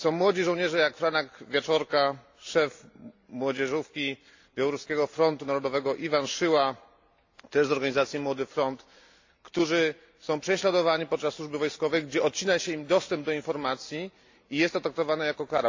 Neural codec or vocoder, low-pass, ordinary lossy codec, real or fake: none; 7.2 kHz; AAC, 48 kbps; real